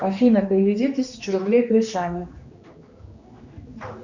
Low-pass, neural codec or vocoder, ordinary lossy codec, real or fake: 7.2 kHz; codec, 16 kHz, 2 kbps, X-Codec, HuBERT features, trained on general audio; Opus, 64 kbps; fake